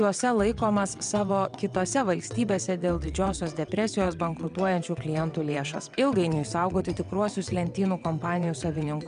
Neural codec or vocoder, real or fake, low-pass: vocoder, 22.05 kHz, 80 mel bands, WaveNeXt; fake; 9.9 kHz